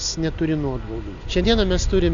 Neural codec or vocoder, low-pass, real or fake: none; 7.2 kHz; real